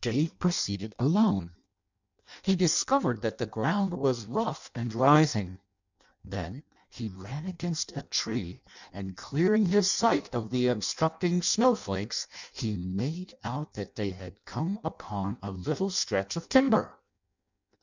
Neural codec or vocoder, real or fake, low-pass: codec, 16 kHz in and 24 kHz out, 0.6 kbps, FireRedTTS-2 codec; fake; 7.2 kHz